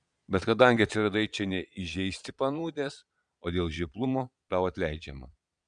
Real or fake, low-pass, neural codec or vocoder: fake; 9.9 kHz; vocoder, 22.05 kHz, 80 mel bands, Vocos